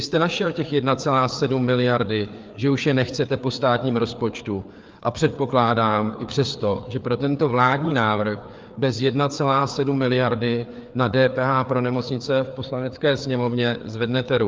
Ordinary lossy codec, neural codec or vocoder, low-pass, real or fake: Opus, 24 kbps; codec, 16 kHz, 4 kbps, FreqCodec, larger model; 7.2 kHz; fake